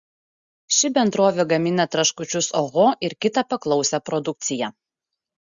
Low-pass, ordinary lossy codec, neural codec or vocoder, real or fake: 7.2 kHz; Opus, 64 kbps; none; real